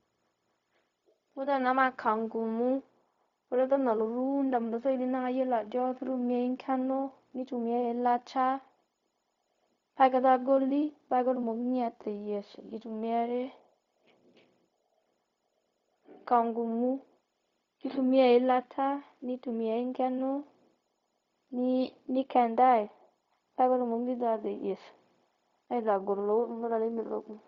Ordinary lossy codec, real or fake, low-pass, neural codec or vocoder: Opus, 64 kbps; fake; 7.2 kHz; codec, 16 kHz, 0.4 kbps, LongCat-Audio-Codec